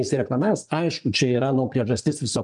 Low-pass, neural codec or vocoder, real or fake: 10.8 kHz; vocoder, 24 kHz, 100 mel bands, Vocos; fake